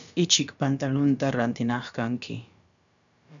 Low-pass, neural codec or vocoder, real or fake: 7.2 kHz; codec, 16 kHz, about 1 kbps, DyCAST, with the encoder's durations; fake